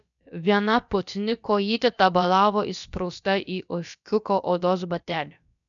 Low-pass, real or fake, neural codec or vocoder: 7.2 kHz; fake; codec, 16 kHz, about 1 kbps, DyCAST, with the encoder's durations